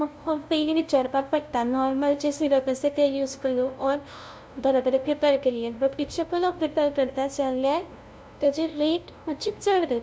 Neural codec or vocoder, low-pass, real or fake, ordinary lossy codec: codec, 16 kHz, 0.5 kbps, FunCodec, trained on LibriTTS, 25 frames a second; none; fake; none